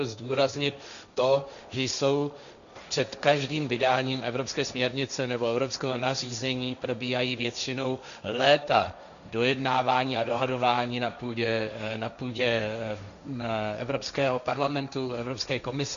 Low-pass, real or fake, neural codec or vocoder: 7.2 kHz; fake; codec, 16 kHz, 1.1 kbps, Voila-Tokenizer